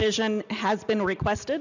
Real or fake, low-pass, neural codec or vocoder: real; 7.2 kHz; none